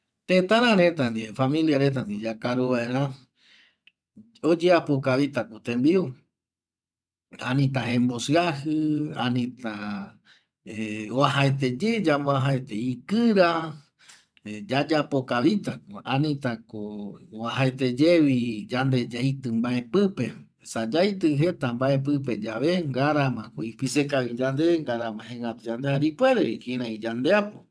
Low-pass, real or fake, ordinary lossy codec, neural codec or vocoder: none; fake; none; vocoder, 22.05 kHz, 80 mel bands, WaveNeXt